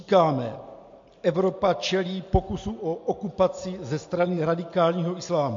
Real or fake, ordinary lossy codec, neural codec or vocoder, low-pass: real; MP3, 48 kbps; none; 7.2 kHz